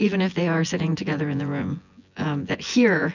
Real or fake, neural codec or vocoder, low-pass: fake; vocoder, 24 kHz, 100 mel bands, Vocos; 7.2 kHz